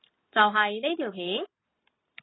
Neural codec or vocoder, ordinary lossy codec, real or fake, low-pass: none; AAC, 16 kbps; real; 7.2 kHz